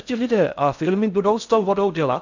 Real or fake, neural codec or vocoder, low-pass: fake; codec, 16 kHz in and 24 kHz out, 0.6 kbps, FocalCodec, streaming, 4096 codes; 7.2 kHz